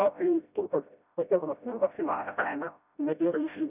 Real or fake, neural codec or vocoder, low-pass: fake; codec, 16 kHz, 0.5 kbps, FreqCodec, smaller model; 3.6 kHz